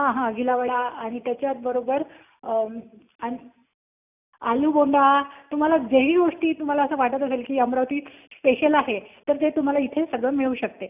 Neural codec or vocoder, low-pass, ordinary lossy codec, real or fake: none; 3.6 kHz; none; real